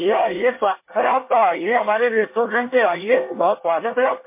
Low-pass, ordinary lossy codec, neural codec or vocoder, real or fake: 3.6 kHz; MP3, 24 kbps; codec, 24 kHz, 1 kbps, SNAC; fake